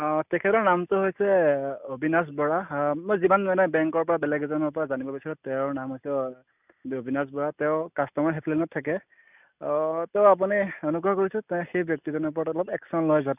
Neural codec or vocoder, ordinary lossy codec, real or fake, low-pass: none; none; real; 3.6 kHz